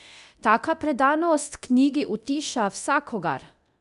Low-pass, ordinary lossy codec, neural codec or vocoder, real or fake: 10.8 kHz; none; codec, 24 kHz, 0.9 kbps, DualCodec; fake